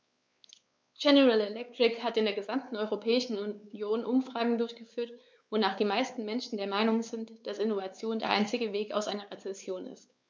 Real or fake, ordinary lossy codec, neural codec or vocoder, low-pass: fake; none; codec, 16 kHz, 4 kbps, X-Codec, WavLM features, trained on Multilingual LibriSpeech; none